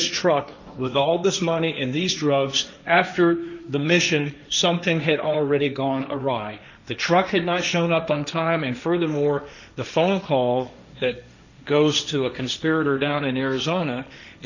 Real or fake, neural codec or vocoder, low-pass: fake; codec, 16 kHz, 1.1 kbps, Voila-Tokenizer; 7.2 kHz